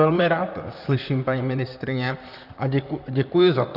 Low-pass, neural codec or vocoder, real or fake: 5.4 kHz; vocoder, 44.1 kHz, 128 mel bands, Pupu-Vocoder; fake